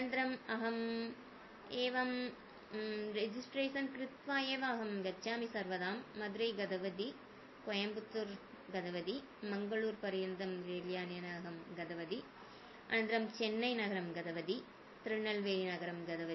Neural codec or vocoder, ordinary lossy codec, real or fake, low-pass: none; MP3, 24 kbps; real; 7.2 kHz